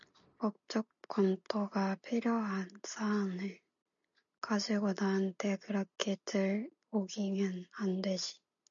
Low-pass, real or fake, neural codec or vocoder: 7.2 kHz; real; none